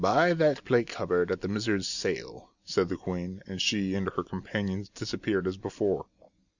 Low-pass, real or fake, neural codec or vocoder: 7.2 kHz; real; none